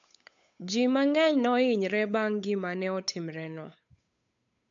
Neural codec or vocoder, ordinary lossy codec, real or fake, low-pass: codec, 16 kHz, 8 kbps, FunCodec, trained on Chinese and English, 25 frames a second; AAC, 64 kbps; fake; 7.2 kHz